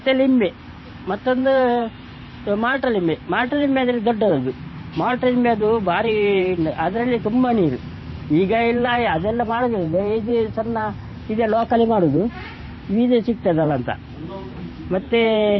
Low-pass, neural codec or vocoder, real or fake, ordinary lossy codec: 7.2 kHz; vocoder, 44.1 kHz, 128 mel bands every 512 samples, BigVGAN v2; fake; MP3, 24 kbps